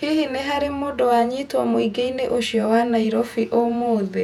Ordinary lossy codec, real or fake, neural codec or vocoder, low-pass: none; fake; vocoder, 48 kHz, 128 mel bands, Vocos; 19.8 kHz